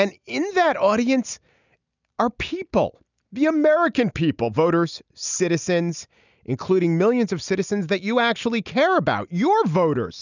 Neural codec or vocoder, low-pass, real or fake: none; 7.2 kHz; real